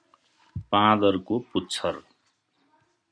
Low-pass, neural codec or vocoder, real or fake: 9.9 kHz; none; real